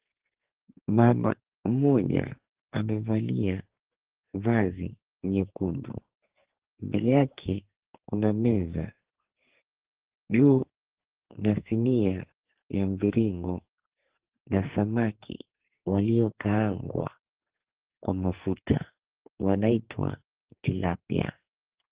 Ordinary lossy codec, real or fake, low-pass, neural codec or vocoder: Opus, 16 kbps; fake; 3.6 kHz; codec, 44.1 kHz, 2.6 kbps, SNAC